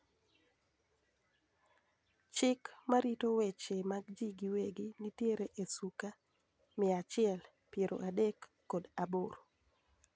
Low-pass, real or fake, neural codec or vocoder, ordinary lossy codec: none; real; none; none